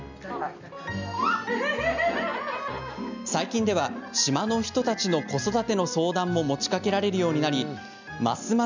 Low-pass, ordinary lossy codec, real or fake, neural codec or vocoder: 7.2 kHz; none; real; none